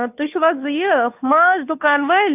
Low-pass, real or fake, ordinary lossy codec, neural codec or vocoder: 3.6 kHz; fake; none; codec, 16 kHz, 2 kbps, FunCodec, trained on Chinese and English, 25 frames a second